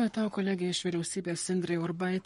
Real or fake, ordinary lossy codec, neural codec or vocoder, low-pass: fake; MP3, 48 kbps; codec, 44.1 kHz, 7.8 kbps, Pupu-Codec; 19.8 kHz